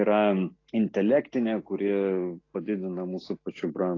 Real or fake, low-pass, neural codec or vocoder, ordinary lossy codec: real; 7.2 kHz; none; AAC, 32 kbps